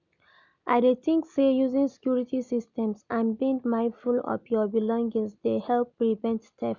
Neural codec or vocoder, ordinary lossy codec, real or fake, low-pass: none; none; real; 7.2 kHz